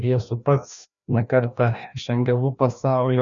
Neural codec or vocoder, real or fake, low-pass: codec, 16 kHz, 1 kbps, FreqCodec, larger model; fake; 7.2 kHz